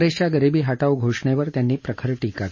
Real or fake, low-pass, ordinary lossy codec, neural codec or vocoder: real; 7.2 kHz; MP3, 32 kbps; none